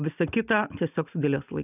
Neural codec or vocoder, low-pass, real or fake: none; 3.6 kHz; real